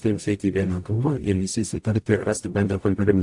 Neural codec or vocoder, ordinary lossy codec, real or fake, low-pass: codec, 44.1 kHz, 0.9 kbps, DAC; AAC, 64 kbps; fake; 10.8 kHz